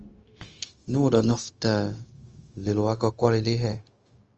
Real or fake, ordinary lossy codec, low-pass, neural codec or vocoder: fake; Opus, 24 kbps; 7.2 kHz; codec, 16 kHz, 0.4 kbps, LongCat-Audio-Codec